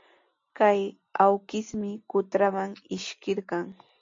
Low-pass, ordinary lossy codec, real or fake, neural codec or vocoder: 7.2 kHz; AAC, 48 kbps; real; none